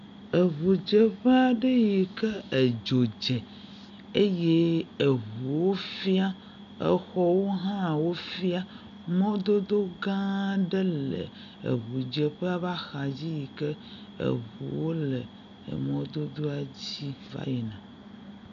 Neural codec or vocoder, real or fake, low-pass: none; real; 7.2 kHz